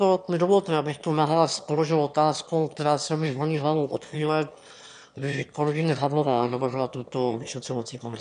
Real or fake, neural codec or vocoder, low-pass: fake; autoencoder, 22.05 kHz, a latent of 192 numbers a frame, VITS, trained on one speaker; 9.9 kHz